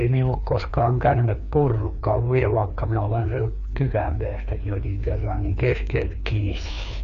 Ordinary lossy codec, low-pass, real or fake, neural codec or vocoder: none; 7.2 kHz; fake; codec, 16 kHz, 2 kbps, FreqCodec, larger model